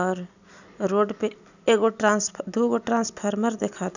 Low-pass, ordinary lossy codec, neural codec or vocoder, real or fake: 7.2 kHz; none; none; real